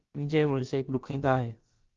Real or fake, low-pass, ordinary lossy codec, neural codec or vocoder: fake; 7.2 kHz; Opus, 32 kbps; codec, 16 kHz, about 1 kbps, DyCAST, with the encoder's durations